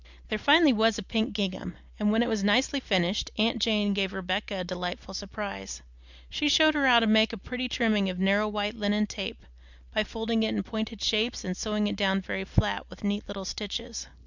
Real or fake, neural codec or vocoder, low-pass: real; none; 7.2 kHz